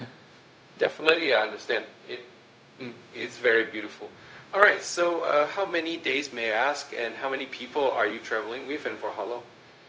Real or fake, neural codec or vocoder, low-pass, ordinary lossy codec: fake; codec, 16 kHz, 0.4 kbps, LongCat-Audio-Codec; none; none